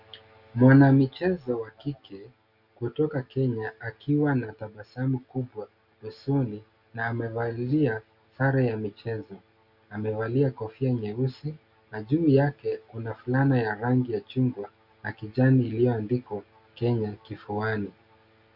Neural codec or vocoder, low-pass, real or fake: none; 5.4 kHz; real